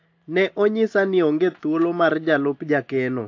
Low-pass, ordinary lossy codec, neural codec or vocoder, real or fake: 7.2 kHz; AAC, 48 kbps; none; real